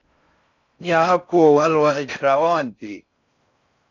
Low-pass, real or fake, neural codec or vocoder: 7.2 kHz; fake; codec, 16 kHz in and 24 kHz out, 0.6 kbps, FocalCodec, streaming, 4096 codes